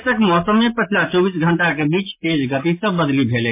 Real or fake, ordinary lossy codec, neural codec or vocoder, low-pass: real; MP3, 24 kbps; none; 3.6 kHz